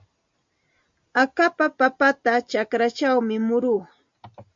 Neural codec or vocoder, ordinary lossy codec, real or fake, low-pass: none; MP3, 48 kbps; real; 7.2 kHz